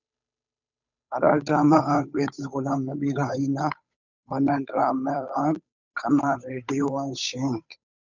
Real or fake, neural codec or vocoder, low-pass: fake; codec, 16 kHz, 8 kbps, FunCodec, trained on Chinese and English, 25 frames a second; 7.2 kHz